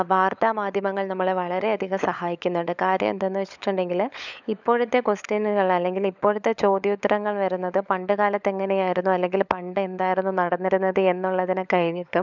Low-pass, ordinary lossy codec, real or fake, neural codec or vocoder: 7.2 kHz; none; fake; codec, 16 kHz, 16 kbps, FunCodec, trained on LibriTTS, 50 frames a second